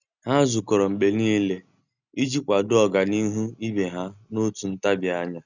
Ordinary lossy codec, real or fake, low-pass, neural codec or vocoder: none; real; 7.2 kHz; none